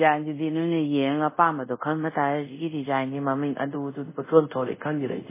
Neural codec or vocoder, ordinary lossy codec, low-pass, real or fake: codec, 24 kHz, 0.5 kbps, DualCodec; MP3, 16 kbps; 3.6 kHz; fake